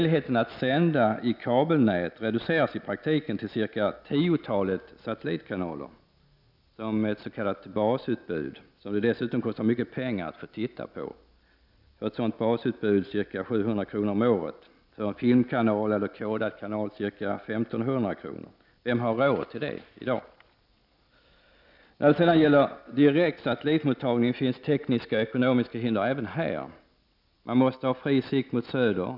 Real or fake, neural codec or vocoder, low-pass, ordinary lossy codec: real; none; 5.4 kHz; none